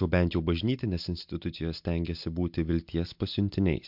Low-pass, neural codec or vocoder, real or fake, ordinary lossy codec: 5.4 kHz; none; real; MP3, 48 kbps